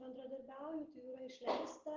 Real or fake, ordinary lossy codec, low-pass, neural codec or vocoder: real; Opus, 16 kbps; 7.2 kHz; none